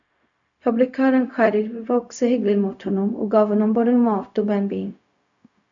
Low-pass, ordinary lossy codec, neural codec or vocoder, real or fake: 7.2 kHz; AAC, 64 kbps; codec, 16 kHz, 0.4 kbps, LongCat-Audio-Codec; fake